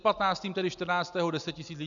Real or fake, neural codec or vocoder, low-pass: real; none; 7.2 kHz